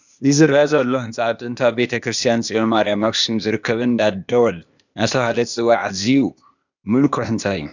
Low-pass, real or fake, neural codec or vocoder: 7.2 kHz; fake; codec, 16 kHz, 0.8 kbps, ZipCodec